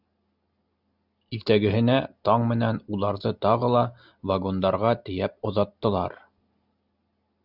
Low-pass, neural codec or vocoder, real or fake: 5.4 kHz; none; real